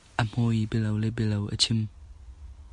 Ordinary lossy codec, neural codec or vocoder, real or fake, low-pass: MP3, 48 kbps; none; real; 10.8 kHz